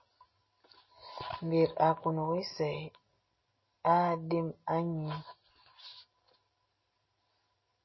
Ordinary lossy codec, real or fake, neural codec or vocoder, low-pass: MP3, 24 kbps; real; none; 7.2 kHz